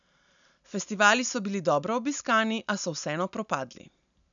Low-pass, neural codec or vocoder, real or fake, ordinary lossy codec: 7.2 kHz; none; real; none